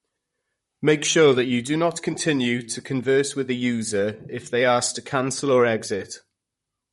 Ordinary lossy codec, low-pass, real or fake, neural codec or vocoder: MP3, 48 kbps; 19.8 kHz; fake; vocoder, 44.1 kHz, 128 mel bands, Pupu-Vocoder